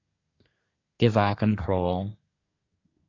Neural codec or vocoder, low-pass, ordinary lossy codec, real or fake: codec, 24 kHz, 1 kbps, SNAC; 7.2 kHz; AAC, 32 kbps; fake